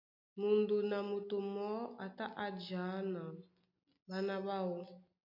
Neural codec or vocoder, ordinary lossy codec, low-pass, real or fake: none; MP3, 48 kbps; 5.4 kHz; real